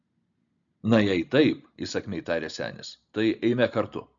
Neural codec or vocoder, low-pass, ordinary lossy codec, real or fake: none; 7.2 kHz; MP3, 96 kbps; real